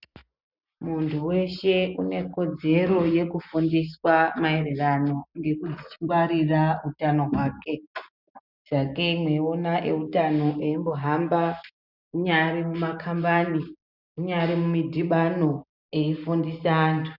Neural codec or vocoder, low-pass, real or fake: none; 5.4 kHz; real